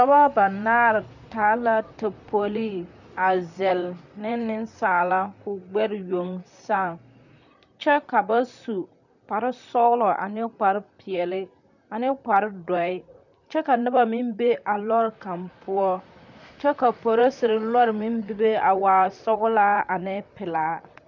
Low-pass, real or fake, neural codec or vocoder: 7.2 kHz; fake; vocoder, 44.1 kHz, 128 mel bands, Pupu-Vocoder